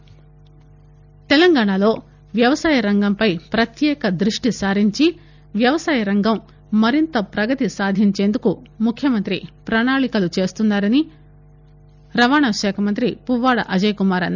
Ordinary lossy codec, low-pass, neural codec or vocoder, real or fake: none; 7.2 kHz; none; real